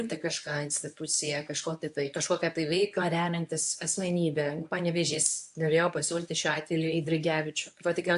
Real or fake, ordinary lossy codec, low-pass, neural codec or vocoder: fake; AAC, 64 kbps; 10.8 kHz; codec, 24 kHz, 0.9 kbps, WavTokenizer, medium speech release version 1